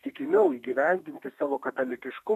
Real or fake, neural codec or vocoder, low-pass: fake; codec, 32 kHz, 1.9 kbps, SNAC; 14.4 kHz